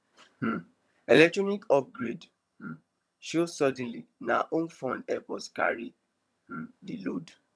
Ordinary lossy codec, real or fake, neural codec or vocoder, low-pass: none; fake; vocoder, 22.05 kHz, 80 mel bands, HiFi-GAN; none